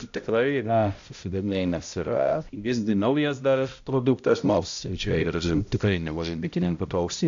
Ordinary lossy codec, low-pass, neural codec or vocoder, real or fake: AAC, 64 kbps; 7.2 kHz; codec, 16 kHz, 0.5 kbps, X-Codec, HuBERT features, trained on balanced general audio; fake